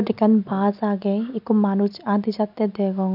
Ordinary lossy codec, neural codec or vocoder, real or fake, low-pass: none; none; real; 5.4 kHz